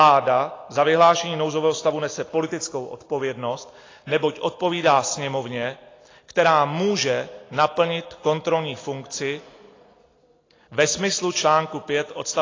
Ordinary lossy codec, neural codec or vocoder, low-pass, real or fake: AAC, 32 kbps; none; 7.2 kHz; real